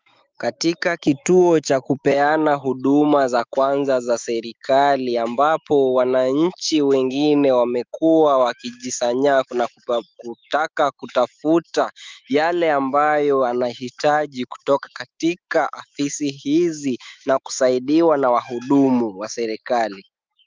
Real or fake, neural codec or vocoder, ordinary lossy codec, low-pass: fake; autoencoder, 48 kHz, 128 numbers a frame, DAC-VAE, trained on Japanese speech; Opus, 24 kbps; 7.2 kHz